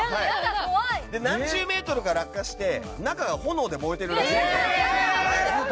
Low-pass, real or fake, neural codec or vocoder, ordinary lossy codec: none; real; none; none